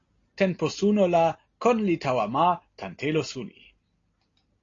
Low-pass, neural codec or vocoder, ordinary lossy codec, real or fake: 7.2 kHz; none; AAC, 32 kbps; real